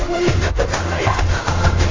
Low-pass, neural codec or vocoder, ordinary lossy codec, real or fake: 7.2 kHz; codec, 16 kHz in and 24 kHz out, 0.4 kbps, LongCat-Audio-Codec, fine tuned four codebook decoder; AAC, 32 kbps; fake